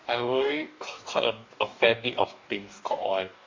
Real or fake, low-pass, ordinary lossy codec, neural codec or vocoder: fake; 7.2 kHz; MP3, 48 kbps; codec, 44.1 kHz, 2.6 kbps, DAC